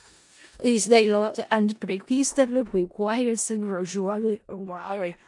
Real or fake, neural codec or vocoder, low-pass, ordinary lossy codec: fake; codec, 16 kHz in and 24 kHz out, 0.4 kbps, LongCat-Audio-Codec, four codebook decoder; 10.8 kHz; AAC, 64 kbps